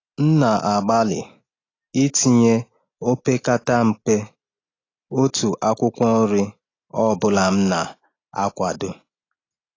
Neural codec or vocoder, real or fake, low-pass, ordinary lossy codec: none; real; 7.2 kHz; AAC, 32 kbps